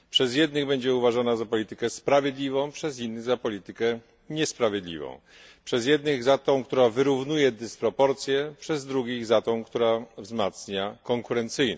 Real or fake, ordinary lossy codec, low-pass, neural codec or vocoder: real; none; none; none